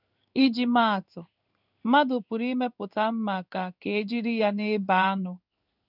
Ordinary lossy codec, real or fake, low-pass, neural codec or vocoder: none; fake; 5.4 kHz; codec, 16 kHz in and 24 kHz out, 1 kbps, XY-Tokenizer